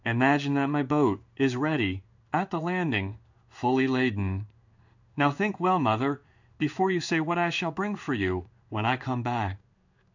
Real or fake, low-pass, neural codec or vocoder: fake; 7.2 kHz; codec, 16 kHz in and 24 kHz out, 1 kbps, XY-Tokenizer